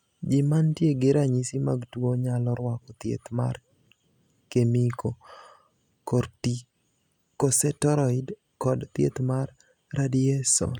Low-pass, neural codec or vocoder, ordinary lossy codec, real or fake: 19.8 kHz; none; none; real